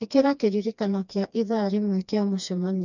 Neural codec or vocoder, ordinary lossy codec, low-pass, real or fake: codec, 16 kHz, 2 kbps, FreqCodec, smaller model; none; 7.2 kHz; fake